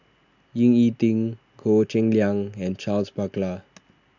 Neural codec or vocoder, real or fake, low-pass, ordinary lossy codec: none; real; 7.2 kHz; none